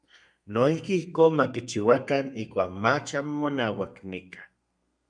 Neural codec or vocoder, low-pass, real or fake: codec, 32 kHz, 1.9 kbps, SNAC; 9.9 kHz; fake